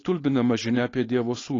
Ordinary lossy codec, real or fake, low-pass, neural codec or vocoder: AAC, 32 kbps; fake; 7.2 kHz; codec, 16 kHz, 4.8 kbps, FACodec